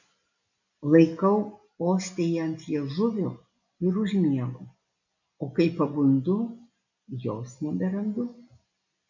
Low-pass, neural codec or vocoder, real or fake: 7.2 kHz; none; real